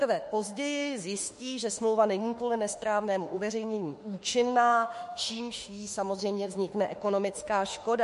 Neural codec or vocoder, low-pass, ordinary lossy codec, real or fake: autoencoder, 48 kHz, 32 numbers a frame, DAC-VAE, trained on Japanese speech; 14.4 kHz; MP3, 48 kbps; fake